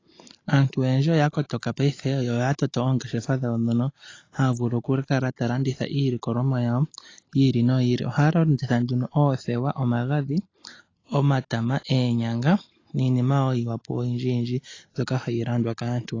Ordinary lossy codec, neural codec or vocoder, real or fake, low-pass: AAC, 32 kbps; none; real; 7.2 kHz